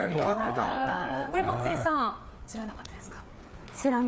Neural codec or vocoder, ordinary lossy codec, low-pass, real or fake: codec, 16 kHz, 4 kbps, FreqCodec, larger model; none; none; fake